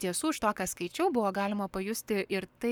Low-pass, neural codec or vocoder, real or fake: 19.8 kHz; codec, 44.1 kHz, 7.8 kbps, Pupu-Codec; fake